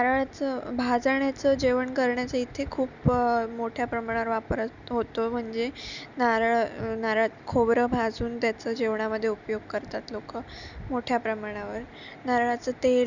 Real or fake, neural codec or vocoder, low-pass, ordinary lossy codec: real; none; 7.2 kHz; none